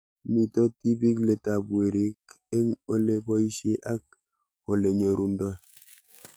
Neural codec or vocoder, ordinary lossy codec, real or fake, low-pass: codec, 44.1 kHz, 7.8 kbps, DAC; none; fake; none